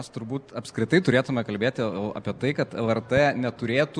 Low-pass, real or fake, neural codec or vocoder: 9.9 kHz; real; none